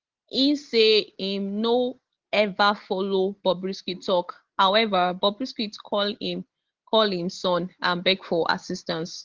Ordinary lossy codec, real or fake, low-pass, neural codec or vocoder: Opus, 16 kbps; real; 7.2 kHz; none